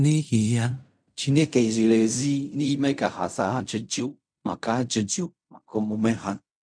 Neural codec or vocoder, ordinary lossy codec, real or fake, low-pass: codec, 16 kHz in and 24 kHz out, 0.4 kbps, LongCat-Audio-Codec, fine tuned four codebook decoder; none; fake; 9.9 kHz